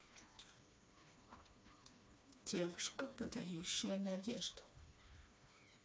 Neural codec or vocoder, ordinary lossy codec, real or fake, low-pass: codec, 16 kHz, 2 kbps, FreqCodec, smaller model; none; fake; none